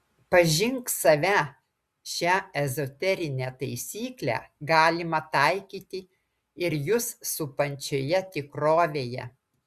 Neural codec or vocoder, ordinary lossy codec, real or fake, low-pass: none; Opus, 64 kbps; real; 14.4 kHz